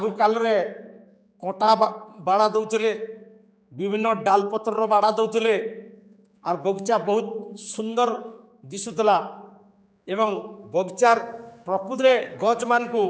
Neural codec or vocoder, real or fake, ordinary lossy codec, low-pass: codec, 16 kHz, 4 kbps, X-Codec, HuBERT features, trained on general audio; fake; none; none